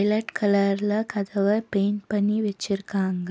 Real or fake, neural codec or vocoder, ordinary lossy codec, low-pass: real; none; none; none